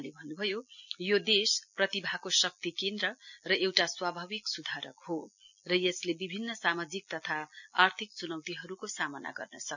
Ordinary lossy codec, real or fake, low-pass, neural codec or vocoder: none; real; 7.2 kHz; none